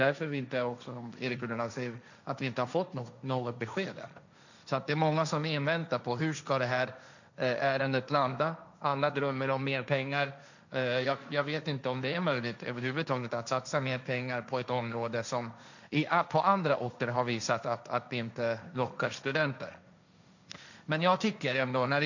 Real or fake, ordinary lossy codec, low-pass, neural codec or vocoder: fake; none; 7.2 kHz; codec, 16 kHz, 1.1 kbps, Voila-Tokenizer